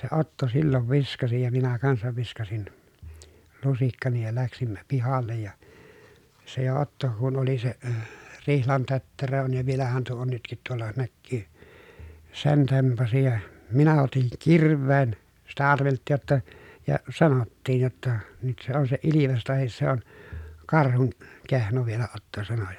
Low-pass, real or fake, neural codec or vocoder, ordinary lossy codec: 19.8 kHz; real; none; none